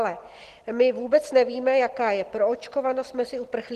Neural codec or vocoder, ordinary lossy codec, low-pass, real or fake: none; Opus, 16 kbps; 14.4 kHz; real